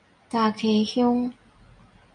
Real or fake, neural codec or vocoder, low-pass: real; none; 9.9 kHz